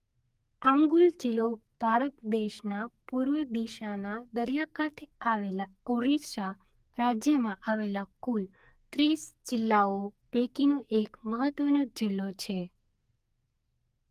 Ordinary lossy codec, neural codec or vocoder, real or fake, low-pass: Opus, 32 kbps; codec, 44.1 kHz, 2.6 kbps, SNAC; fake; 14.4 kHz